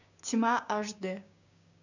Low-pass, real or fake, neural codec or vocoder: 7.2 kHz; fake; codec, 16 kHz, 6 kbps, DAC